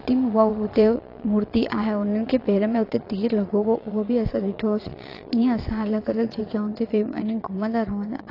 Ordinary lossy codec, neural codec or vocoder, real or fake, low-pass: AAC, 32 kbps; vocoder, 22.05 kHz, 80 mel bands, Vocos; fake; 5.4 kHz